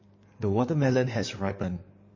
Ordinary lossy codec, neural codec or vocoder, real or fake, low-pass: MP3, 32 kbps; codec, 16 kHz in and 24 kHz out, 2.2 kbps, FireRedTTS-2 codec; fake; 7.2 kHz